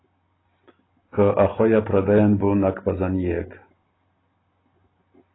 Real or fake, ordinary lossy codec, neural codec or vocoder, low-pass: real; AAC, 16 kbps; none; 7.2 kHz